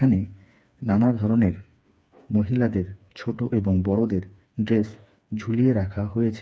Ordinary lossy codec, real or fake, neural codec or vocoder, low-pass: none; fake; codec, 16 kHz, 4 kbps, FreqCodec, smaller model; none